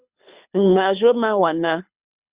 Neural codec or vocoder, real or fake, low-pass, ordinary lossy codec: codec, 24 kHz, 6 kbps, HILCodec; fake; 3.6 kHz; Opus, 64 kbps